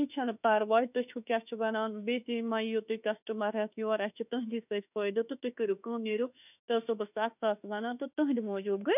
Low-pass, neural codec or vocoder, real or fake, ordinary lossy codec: 3.6 kHz; autoencoder, 48 kHz, 32 numbers a frame, DAC-VAE, trained on Japanese speech; fake; none